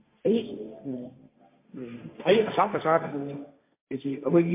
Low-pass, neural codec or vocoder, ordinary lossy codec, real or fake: 3.6 kHz; codec, 16 kHz, 1.1 kbps, Voila-Tokenizer; MP3, 24 kbps; fake